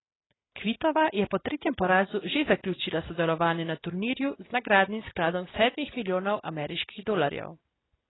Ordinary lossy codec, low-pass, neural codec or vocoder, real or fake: AAC, 16 kbps; 7.2 kHz; none; real